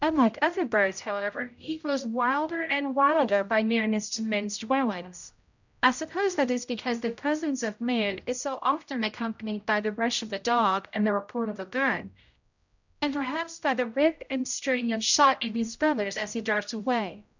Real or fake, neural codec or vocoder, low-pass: fake; codec, 16 kHz, 0.5 kbps, X-Codec, HuBERT features, trained on general audio; 7.2 kHz